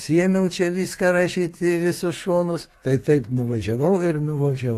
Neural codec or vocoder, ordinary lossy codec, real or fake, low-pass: codec, 32 kHz, 1.9 kbps, SNAC; AAC, 64 kbps; fake; 14.4 kHz